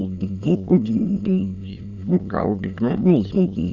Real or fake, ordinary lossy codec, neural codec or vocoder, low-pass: fake; none; autoencoder, 22.05 kHz, a latent of 192 numbers a frame, VITS, trained on many speakers; 7.2 kHz